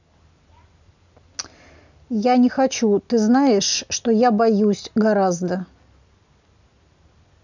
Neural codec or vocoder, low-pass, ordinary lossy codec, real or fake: none; 7.2 kHz; none; real